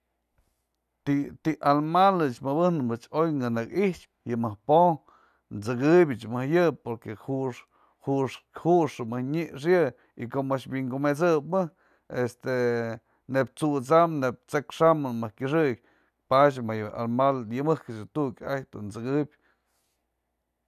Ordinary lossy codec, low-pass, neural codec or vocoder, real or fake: none; 14.4 kHz; none; real